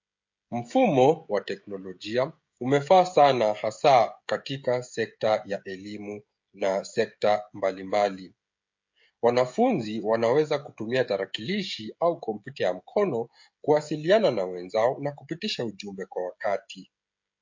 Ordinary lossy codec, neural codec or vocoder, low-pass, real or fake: MP3, 48 kbps; codec, 16 kHz, 16 kbps, FreqCodec, smaller model; 7.2 kHz; fake